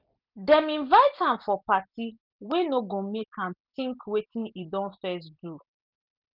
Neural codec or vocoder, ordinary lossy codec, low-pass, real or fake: none; none; 5.4 kHz; real